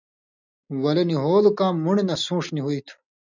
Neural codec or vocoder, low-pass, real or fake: none; 7.2 kHz; real